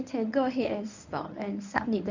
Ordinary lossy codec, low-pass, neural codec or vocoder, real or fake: none; 7.2 kHz; codec, 24 kHz, 0.9 kbps, WavTokenizer, medium speech release version 1; fake